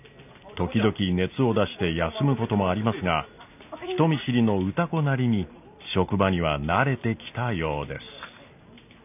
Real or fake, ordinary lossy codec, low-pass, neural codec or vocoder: real; none; 3.6 kHz; none